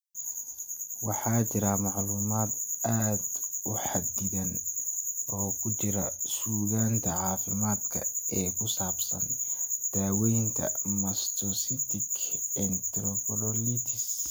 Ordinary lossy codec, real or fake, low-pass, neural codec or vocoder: none; real; none; none